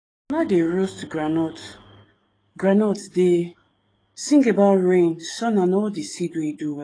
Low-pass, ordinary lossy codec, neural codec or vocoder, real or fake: 9.9 kHz; AAC, 32 kbps; codec, 44.1 kHz, 7.8 kbps, DAC; fake